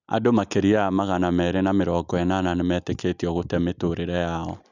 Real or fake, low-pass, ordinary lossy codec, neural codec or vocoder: real; 7.2 kHz; none; none